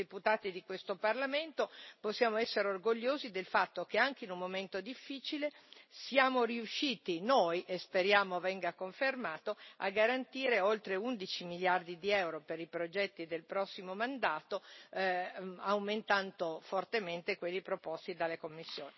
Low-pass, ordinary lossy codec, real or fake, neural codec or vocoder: 7.2 kHz; MP3, 24 kbps; real; none